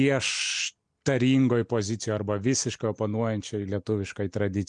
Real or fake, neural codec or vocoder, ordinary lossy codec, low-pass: real; none; MP3, 96 kbps; 9.9 kHz